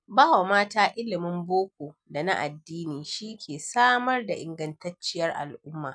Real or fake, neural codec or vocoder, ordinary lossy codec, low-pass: real; none; none; 9.9 kHz